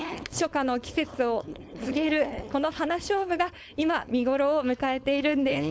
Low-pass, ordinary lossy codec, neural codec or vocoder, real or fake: none; none; codec, 16 kHz, 4.8 kbps, FACodec; fake